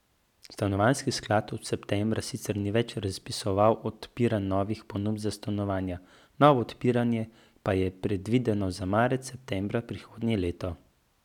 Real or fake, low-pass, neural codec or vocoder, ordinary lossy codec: real; 19.8 kHz; none; none